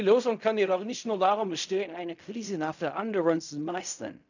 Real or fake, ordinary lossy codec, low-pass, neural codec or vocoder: fake; none; 7.2 kHz; codec, 16 kHz in and 24 kHz out, 0.4 kbps, LongCat-Audio-Codec, fine tuned four codebook decoder